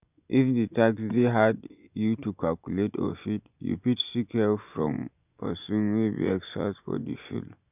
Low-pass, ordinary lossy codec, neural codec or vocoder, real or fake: 3.6 kHz; none; vocoder, 24 kHz, 100 mel bands, Vocos; fake